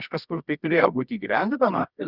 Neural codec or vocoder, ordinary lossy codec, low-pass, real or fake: codec, 24 kHz, 0.9 kbps, WavTokenizer, medium music audio release; Opus, 64 kbps; 5.4 kHz; fake